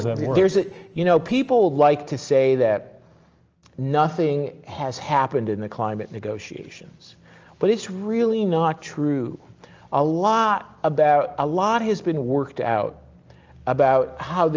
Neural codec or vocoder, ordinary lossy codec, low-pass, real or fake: none; Opus, 24 kbps; 7.2 kHz; real